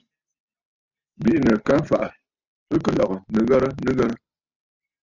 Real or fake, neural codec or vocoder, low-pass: real; none; 7.2 kHz